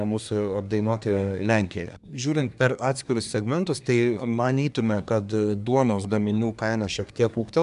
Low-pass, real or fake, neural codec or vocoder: 10.8 kHz; fake; codec, 24 kHz, 1 kbps, SNAC